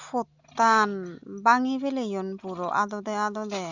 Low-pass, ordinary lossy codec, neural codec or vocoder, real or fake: 7.2 kHz; Opus, 64 kbps; none; real